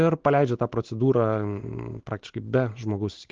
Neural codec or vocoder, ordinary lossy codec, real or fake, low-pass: none; Opus, 16 kbps; real; 7.2 kHz